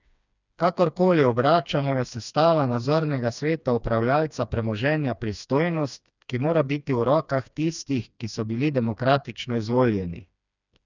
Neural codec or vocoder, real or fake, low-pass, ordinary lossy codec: codec, 16 kHz, 2 kbps, FreqCodec, smaller model; fake; 7.2 kHz; none